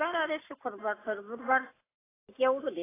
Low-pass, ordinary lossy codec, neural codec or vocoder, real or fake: 3.6 kHz; AAC, 16 kbps; none; real